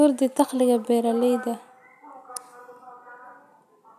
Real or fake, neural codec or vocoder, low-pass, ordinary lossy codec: real; none; 14.4 kHz; none